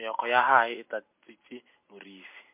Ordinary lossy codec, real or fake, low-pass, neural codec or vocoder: MP3, 24 kbps; real; 3.6 kHz; none